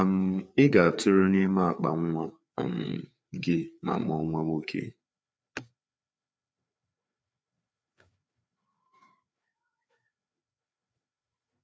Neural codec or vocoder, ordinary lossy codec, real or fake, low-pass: codec, 16 kHz, 4 kbps, FreqCodec, larger model; none; fake; none